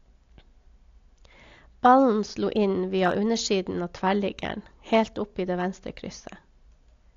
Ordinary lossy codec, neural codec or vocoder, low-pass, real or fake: AAC, 48 kbps; none; 7.2 kHz; real